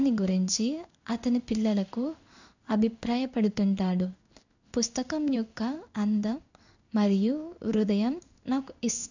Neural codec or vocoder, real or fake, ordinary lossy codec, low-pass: codec, 16 kHz in and 24 kHz out, 1 kbps, XY-Tokenizer; fake; none; 7.2 kHz